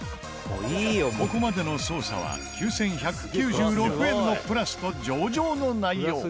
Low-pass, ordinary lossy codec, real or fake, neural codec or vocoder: none; none; real; none